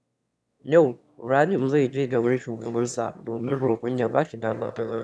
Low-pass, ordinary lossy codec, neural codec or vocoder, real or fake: none; none; autoencoder, 22.05 kHz, a latent of 192 numbers a frame, VITS, trained on one speaker; fake